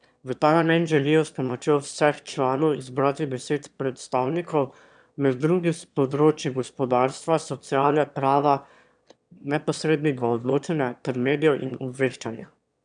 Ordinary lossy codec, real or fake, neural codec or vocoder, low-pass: none; fake; autoencoder, 22.05 kHz, a latent of 192 numbers a frame, VITS, trained on one speaker; 9.9 kHz